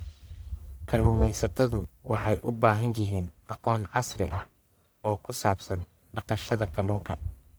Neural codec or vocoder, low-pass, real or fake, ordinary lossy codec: codec, 44.1 kHz, 1.7 kbps, Pupu-Codec; none; fake; none